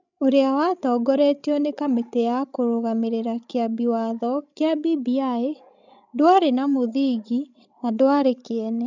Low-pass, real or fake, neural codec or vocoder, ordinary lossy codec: 7.2 kHz; fake; codec, 16 kHz, 16 kbps, FreqCodec, larger model; none